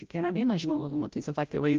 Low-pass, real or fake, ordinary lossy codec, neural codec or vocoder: 7.2 kHz; fake; Opus, 32 kbps; codec, 16 kHz, 0.5 kbps, FreqCodec, larger model